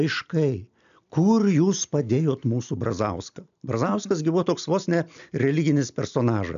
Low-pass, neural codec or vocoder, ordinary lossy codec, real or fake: 7.2 kHz; none; AAC, 96 kbps; real